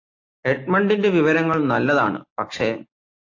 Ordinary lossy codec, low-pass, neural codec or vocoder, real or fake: AAC, 48 kbps; 7.2 kHz; none; real